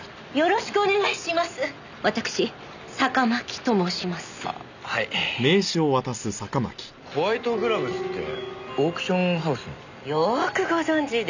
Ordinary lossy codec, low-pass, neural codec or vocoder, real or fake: none; 7.2 kHz; none; real